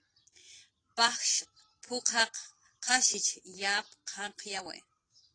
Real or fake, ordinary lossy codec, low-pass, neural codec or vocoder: real; AAC, 32 kbps; 9.9 kHz; none